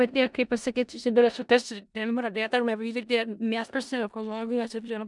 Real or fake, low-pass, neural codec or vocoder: fake; 10.8 kHz; codec, 16 kHz in and 24 kHz out, 0.4 kbps, LongCat-Audio-Codec, four codebook decoder